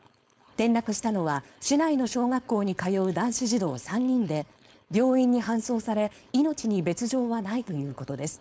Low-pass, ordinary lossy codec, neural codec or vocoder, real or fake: none; none; codec, 16 kHz, 4.8 kbps, FACodec; fake